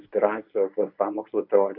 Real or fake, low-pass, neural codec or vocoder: fake; 5.4 kHz; codec, 24 kHz, 0.9 kbps, WavTokenizer, medium speech release version 1